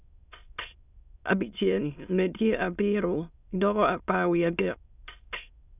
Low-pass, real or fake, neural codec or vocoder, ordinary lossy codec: 3.6 kHz; fake; autoencoder, 22.05 kHz, a latent of 192 numbers a frame, VITS, trained on many speakers; none